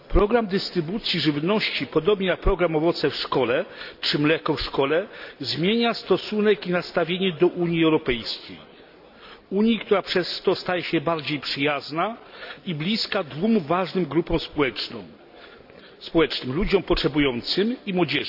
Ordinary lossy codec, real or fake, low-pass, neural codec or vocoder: none; real; 5.4 kHz; none